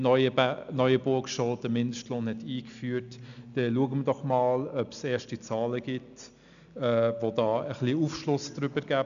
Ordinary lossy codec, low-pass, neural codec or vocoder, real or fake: none; 7.2 kHz; none; real